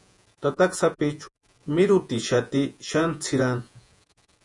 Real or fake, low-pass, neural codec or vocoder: fake; 10.8 kHz; vocoder, 48 kHz, 128 mel bands, Vocos